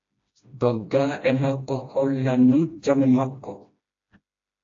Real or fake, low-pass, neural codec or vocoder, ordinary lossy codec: fake; 7.2 kHz; codec, 16 kHz, 1 kbps, FreqCodec, smaller model; AAC, 48 kbps